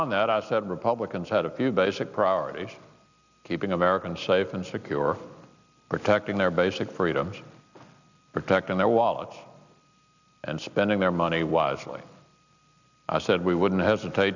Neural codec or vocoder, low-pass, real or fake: none; 7.2 kHz; real